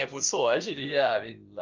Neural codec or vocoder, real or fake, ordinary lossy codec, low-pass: codec, 16 kHz, about 1 kbps, DyCAST, with the encoder's durations; fake; Opus, 24 kbps; 7.2 kHz